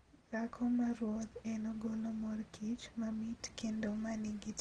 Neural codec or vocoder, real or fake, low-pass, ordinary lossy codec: none; real; 9.9 kHz; Opus, 16 kbps